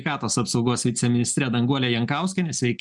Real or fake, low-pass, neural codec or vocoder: real; 10.8 kHz; none